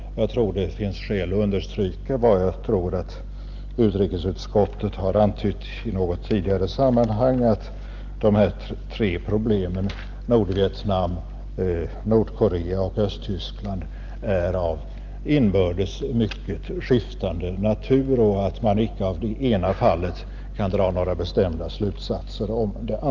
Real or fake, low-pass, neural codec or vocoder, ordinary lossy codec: real; 7.2 kHz; none; Opus, 24 kbps